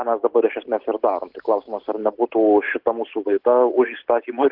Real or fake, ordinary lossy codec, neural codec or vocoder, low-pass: real; Opus, 16 kbps; none; 5.4 kHz